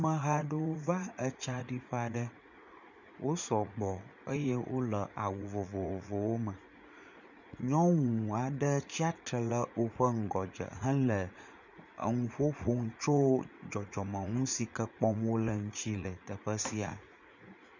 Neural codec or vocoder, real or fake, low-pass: vocoder, 44.1 kHz, 80 mel bands, Vocos; fake; 7.2 kHz